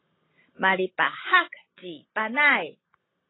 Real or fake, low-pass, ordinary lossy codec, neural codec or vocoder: real; 7.2 kHz; AAC, 16 kbps; none